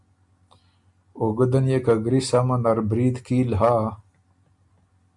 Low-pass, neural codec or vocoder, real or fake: 10.8 kHz; none; real